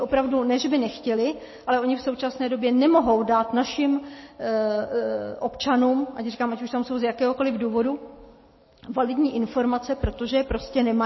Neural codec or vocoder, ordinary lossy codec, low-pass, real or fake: none; MP3, 24 kbps; 7.2 kHz; real